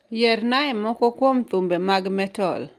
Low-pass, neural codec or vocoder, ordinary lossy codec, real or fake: 14.4 kHz; none; Opus, 32 kbps; real